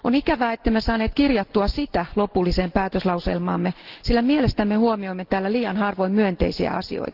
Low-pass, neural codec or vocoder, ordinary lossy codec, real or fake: 5.4 kHz; none; Opus, 16 kbps; real